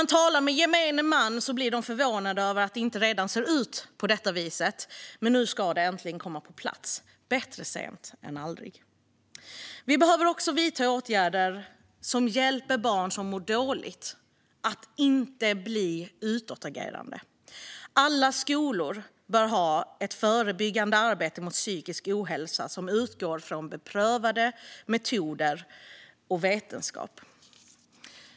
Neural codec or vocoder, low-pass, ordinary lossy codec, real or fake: none; none; none; real